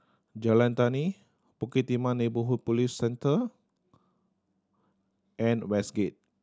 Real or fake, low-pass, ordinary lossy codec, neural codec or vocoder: real; none; none; none